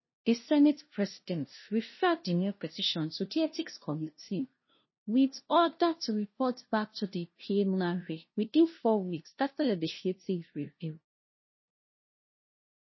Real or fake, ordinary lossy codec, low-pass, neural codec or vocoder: fake; MP3, 24 kbps; 7.2 kHz; codec, 16 kHz, 0.5 kbps, FunCodec, trained on LibriTTS, 25 frames a second